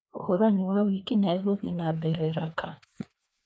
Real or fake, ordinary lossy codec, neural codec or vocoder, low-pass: fake; none; codec, 16 kHz, 2 kbps, FreqCodec, larger model; none